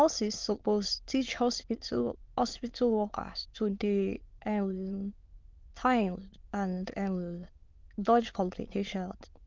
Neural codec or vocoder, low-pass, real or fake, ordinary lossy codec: autoencoder, 22.05 kHz, a latent of 192 numbers a frame, VITS, trained on many speakers; 7.2 kHz; fake; Opus, 32 kbps